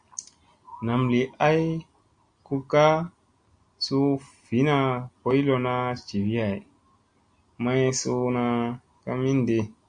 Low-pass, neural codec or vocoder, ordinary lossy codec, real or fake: 9.9 kHz; none; Opus, 64 kbps; real